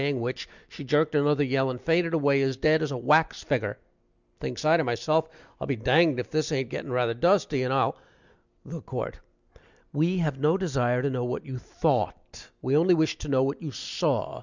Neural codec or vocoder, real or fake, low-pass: none; real; 7.2 kHz